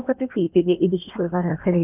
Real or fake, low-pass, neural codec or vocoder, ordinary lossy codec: fake; 3.6 kHz; codec, 16 kHz in and 24 kHz out, 0.8 kbps, FocalCodec, streaming, 65536 codes; none